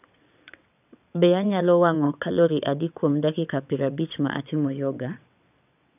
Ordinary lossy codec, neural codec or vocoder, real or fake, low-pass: none; vocoder, 22.05 kHz, 80 mel bands, WaveNeXt; fake; 3.6 kHz